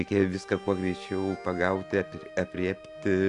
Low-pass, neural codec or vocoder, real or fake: 10.8 kHz; none; real